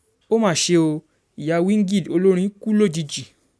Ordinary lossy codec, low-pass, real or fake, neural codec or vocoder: none; none; real; none